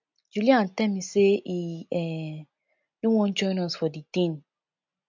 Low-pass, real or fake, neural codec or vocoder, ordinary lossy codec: 7.2 kHz; real; none; MP3, 64 kbps